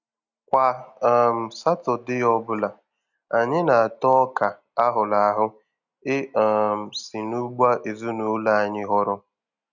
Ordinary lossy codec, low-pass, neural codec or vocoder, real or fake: none; 7.2 kHz; none; real